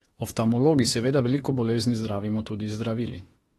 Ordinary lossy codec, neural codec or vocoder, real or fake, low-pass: AAC, 32 kbps; autoencoder, 48 kHz, 32 numbers a frame, DAC-VAE, trained on Japanese speech; fake; 19.8 kHz